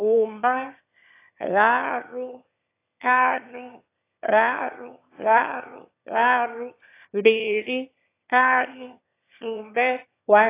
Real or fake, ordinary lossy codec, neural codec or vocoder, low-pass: fake; AAC, 24 kbps; autoencoder, 22.05 kHz, a latent of 192 numbers a frame, VITS, trained on one speaker; 3.6 kHz